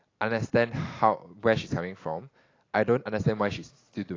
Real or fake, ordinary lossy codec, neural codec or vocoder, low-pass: real; AAC, 32 kbps; none; 7.2 kHz